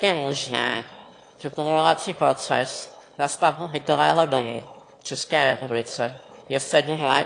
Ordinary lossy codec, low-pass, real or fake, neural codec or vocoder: AAC, 48 kbps; 9.9 kHz; fake; autoencoder, 22.05 kHz, a latent of 192 numbers a frame, VITS, trained on one speaker